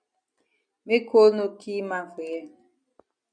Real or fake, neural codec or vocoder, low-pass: real; none; 9.9 kHz